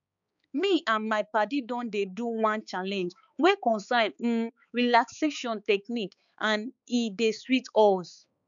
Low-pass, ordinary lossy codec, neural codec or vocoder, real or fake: 7.2 kHz; none; codec, 16 kHz, 4 kbps, X-Codec, HuBERT features, trained on balanced general audio; fake